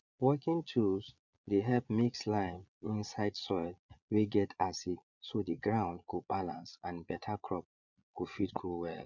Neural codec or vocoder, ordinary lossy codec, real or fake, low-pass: vocoder, 22.05 kHz, 80 mel bands, Vocos; none; fake; 7.2 kHz